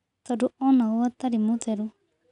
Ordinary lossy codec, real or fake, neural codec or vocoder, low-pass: none; real; none; 10.8 kHz